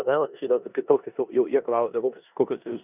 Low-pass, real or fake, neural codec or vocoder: 3.6 kHz; fake; codec, 16 kHz in and 24 kHz out, 0.9 kbps, LongCat-Audio-Codec, four codebook decoder